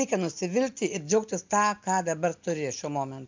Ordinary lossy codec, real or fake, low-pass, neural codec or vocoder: MP3, 64 kbps; real; 7.2 kHz; none